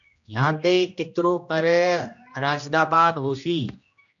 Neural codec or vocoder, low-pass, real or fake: codec, 16 kHz, 1 kbps, X-Codec, HuBERT features, trained on general audio; 7.2 kHz; fake